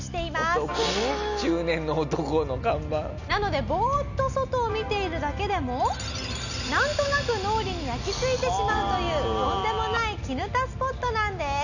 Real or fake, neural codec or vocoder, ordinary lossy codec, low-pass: real; none; none; 7.2 kHz